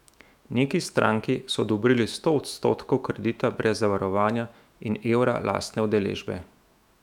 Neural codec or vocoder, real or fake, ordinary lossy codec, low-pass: autoencoder, 48 kHz, 128 numbers a frame, DAC-VAE, trained on Japanese speech; fake; none; 19.8 kHz